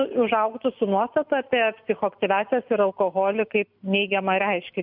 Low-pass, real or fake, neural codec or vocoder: 5.4 kHz; real; none